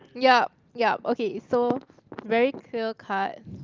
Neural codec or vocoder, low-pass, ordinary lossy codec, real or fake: none; 7.2 kHz; Opus, 24 kbps; real